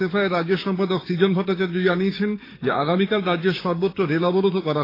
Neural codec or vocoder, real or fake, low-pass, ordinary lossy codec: codec, 16 kHz, 6 kbps, DAC; fake; 5.4 kHz; AAC, 24 kbps